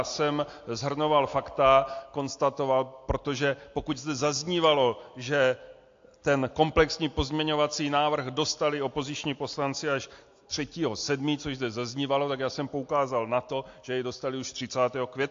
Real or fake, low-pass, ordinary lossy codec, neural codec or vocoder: real; 7.2 kHz; AAC, 48 kbps; none